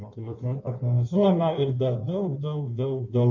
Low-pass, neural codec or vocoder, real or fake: 7.2 kHz; codec, 16 kHz in and 24 kHz out, 1.1 kbps, FireRedTTS-2 codec; fake